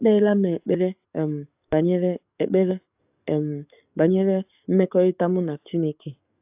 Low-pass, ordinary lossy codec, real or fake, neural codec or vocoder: 3.6 kHz; AAC, 32 kbps; fake; codec, 44.1 kHz, 7.8 kbps, DAC